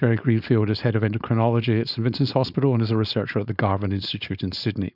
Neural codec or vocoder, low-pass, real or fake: codec, 16 kHz, 4.8 kbps, FACodec; 5.4 kHz; fake